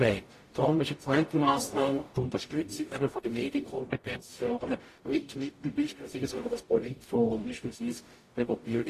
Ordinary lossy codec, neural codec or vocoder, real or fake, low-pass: AAC, 48 kbps; codec, 44.1 kHz, 0.9 kbps, DAC; fake; 14.4 kHz